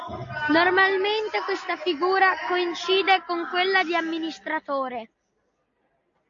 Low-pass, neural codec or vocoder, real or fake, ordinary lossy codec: 7.2 kHz; none; real; AAC, 48 kbps